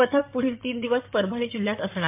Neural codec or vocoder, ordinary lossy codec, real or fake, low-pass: codec, 16 kHz in and 24 kHz out, 2.2 kbps, FireRedTTS-2 codec; MP3, 32 kbps; fake; 3.6 kHz